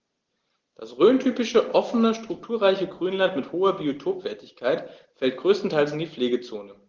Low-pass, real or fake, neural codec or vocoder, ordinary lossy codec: 7.2 kHz; real; none; Opus, 16 kbps